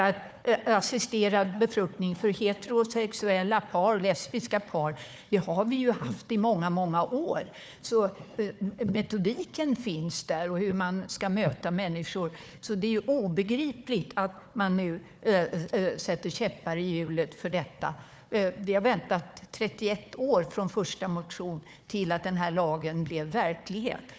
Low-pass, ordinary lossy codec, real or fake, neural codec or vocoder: none; none; fake; codec, 16 kHz, 4 kbps, FunCodec, trained on Chinese and English, 50 frames a second